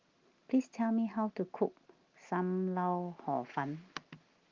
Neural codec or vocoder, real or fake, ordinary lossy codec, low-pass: none; real; Opus, 24 kbps; 7.2 kHz